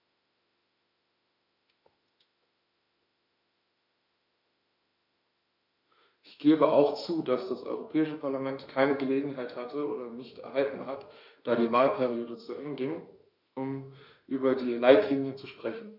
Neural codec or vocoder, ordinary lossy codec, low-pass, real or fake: autoencoder, 48 kHz, 32 numbers a frame, DAC-VAE, trained on Japanese speech; none; 5.4 kHz; fake